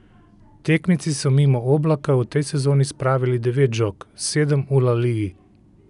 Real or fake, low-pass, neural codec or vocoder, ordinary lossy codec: real; 10.8 kHz; none; none